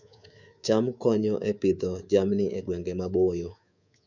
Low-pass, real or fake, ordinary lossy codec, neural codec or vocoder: 7.2 kHz; fake; none; codec, 24 kHz, 3.1 kbps, DualCodec